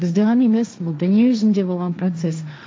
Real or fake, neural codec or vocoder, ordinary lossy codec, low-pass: fake; codec, 16 kHz, 1.1 kbps, Voila-Tokenizer; none; none